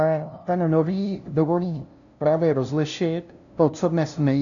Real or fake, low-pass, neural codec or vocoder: fake; 7.2 kHz; codec, 16 kHz, 0.5 kbps, FunCodec, trained on LibriTTS, 25 frames a second